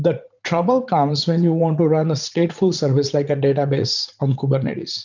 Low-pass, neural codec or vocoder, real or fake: 7.2 kHz; vocoder, 44.1 kHz, 80 mel bands, Vocos; fake